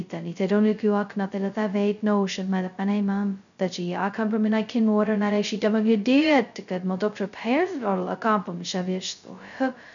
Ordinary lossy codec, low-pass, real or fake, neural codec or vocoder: none; 7.2 kHz; fake; codec, 16 kHz, 0.2 kbps, FocalCodec